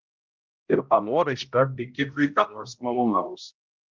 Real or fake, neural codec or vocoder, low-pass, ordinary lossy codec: fake; codec, 16 kHz, 0.5 kbps, X-Codec, HuBERT features, trained on balanced general audio; 7.2 kHz; Opus, 24 kbps